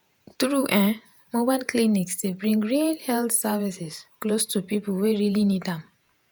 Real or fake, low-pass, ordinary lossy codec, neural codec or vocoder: fake; none; none; vocoder, 48 kHz, 128 mel bands, Vocos